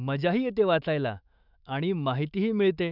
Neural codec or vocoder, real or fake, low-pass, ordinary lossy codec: autoencoder, 48 kHz, 128 numbers a frame, DAC-VAE, trained on Japanese speech; fake; 5.4 kHz; none